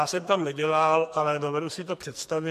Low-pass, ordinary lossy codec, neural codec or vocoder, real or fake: 14.4 kHz; MP3, 64 kbps; codec, 44.1 kHz, 2.6 kbps, SNAC; fake